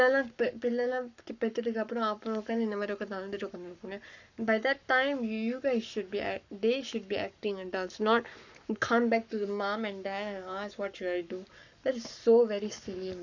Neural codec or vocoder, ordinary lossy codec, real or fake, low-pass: codec, 44.1 kHz, 7.8 kbps, Pupu-Codec; none; fake; 7.2 kHz